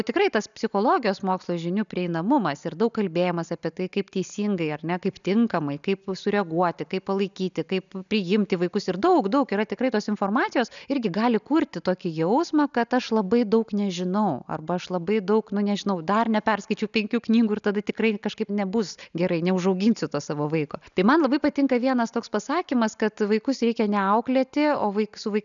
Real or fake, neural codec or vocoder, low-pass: real; none; 7.2 kHz